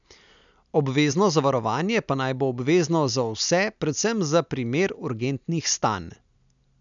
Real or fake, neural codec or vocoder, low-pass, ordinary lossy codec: real; none; 7.2 kHz; MP3, 96 kbps